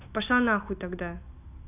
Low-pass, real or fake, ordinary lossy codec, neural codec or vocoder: 3.6 kHz; real; none; none